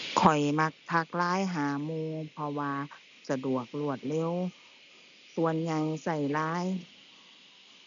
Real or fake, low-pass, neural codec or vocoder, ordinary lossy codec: real; 7.2 kHz; none; none